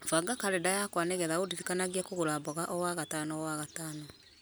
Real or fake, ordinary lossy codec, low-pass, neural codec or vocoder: real; none; none; none